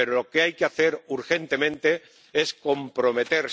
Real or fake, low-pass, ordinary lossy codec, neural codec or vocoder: real; none; none; none